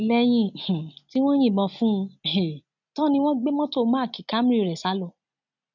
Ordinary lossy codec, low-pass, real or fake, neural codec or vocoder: none; 7.2 kHz; real; none